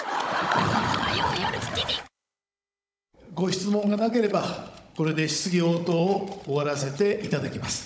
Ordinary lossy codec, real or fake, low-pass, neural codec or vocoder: none; fake; none; codec, 16 kHz, 16 kbps, FunCodec, trained on Chinese and English, 50 frames a second